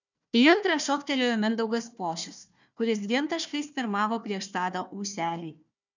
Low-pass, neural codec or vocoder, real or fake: 7.2 kHz; codec, 16 kHz, 1 kbps, FunCodec, trained on Chinese and English, 50 frames a second; fake